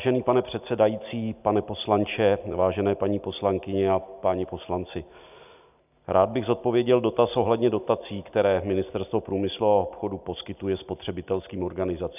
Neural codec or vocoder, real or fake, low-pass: none; real; 3.6 kHz